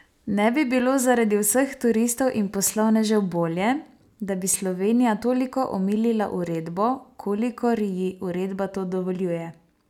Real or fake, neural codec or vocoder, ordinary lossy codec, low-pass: real; none; none; 19.8 kHz